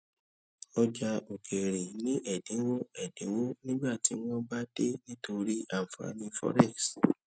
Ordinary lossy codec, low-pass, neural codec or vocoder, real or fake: none; none; none; real